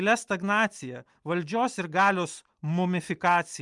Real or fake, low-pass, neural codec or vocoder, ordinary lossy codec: real; 10.8 kHz; none; Opus, 32 kbps